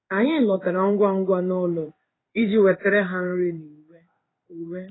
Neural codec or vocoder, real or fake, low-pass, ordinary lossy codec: codec, 16 kHz in and 24 kHz out, 1 kbps, XY-Tokenizer; fake; 7.2 kHz; AAC, 16 kbps